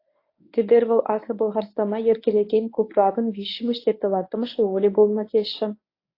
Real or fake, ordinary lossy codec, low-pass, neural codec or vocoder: fake; AAC, 32 kbps; 5.4 kHz; codec, 24 kHz, 0.9 kbps, WavTokenizer, medium speech release version 1